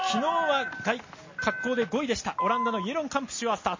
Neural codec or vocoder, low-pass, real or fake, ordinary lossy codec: none; 7.2 kHz; real; MP3, 32 kbps